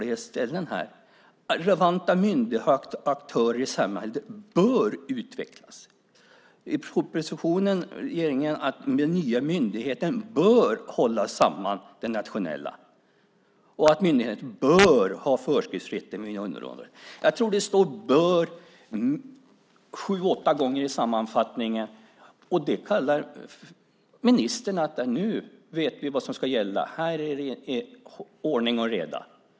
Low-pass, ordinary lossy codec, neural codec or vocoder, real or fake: none; none; none; real